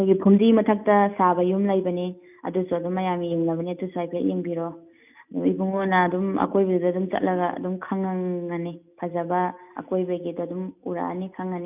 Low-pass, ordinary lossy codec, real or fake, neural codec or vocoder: 3.6 kHz; none; real; none